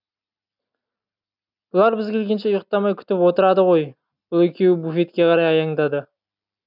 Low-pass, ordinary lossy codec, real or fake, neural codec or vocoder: 5.4 kHz; none; real; none